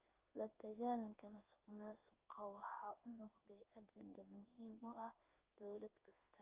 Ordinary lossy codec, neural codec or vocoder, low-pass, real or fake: Opus, 24 kbps; codec, 16 kHz in and 24 kHz out, 1 kbps, XY-Tokenizer; 3.6 kHz; fake